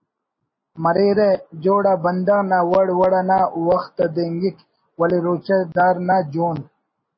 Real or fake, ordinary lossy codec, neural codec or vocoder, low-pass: real; MP3, 24 kbps; none; 7.2 kHz